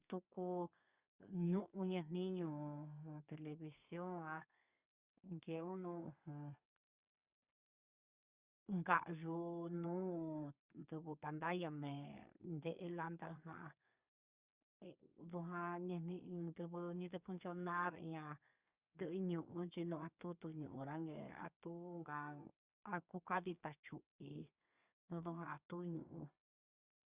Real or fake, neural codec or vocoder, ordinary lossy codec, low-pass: fake; codec, 32 kHz, 1.9 kbps, SNAC; Opus, 64 kbps; 3.6 kHz